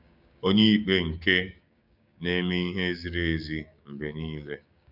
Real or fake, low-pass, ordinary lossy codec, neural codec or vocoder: fake; 5.4 kHz; none; codec, 16 kHz, 6 kbps, DAC